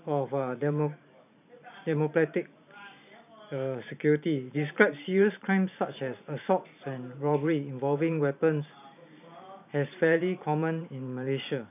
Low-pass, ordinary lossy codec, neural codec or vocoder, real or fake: 3.6 kHz; none; vocoder, 44.1 kHz, 128 mel bands every 512 samples, BigVGAN v2; fake